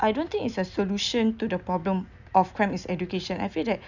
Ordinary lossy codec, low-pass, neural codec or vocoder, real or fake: none; 7.2 kHz; none; real